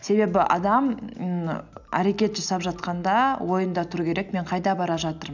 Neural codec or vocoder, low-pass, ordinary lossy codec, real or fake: none; 7.2 kHz; none; real